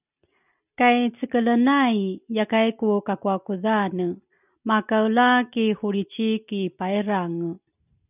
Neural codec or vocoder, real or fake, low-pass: none; real; 3.6 kHz